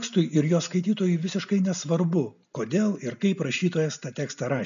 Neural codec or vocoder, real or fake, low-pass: none; real; 7.2 kHz